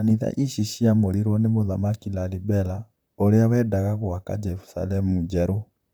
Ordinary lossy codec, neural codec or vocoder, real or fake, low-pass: none; vocoder, 44.1 kHz, 128 mel bands, Pupu-Vocoder; fake; none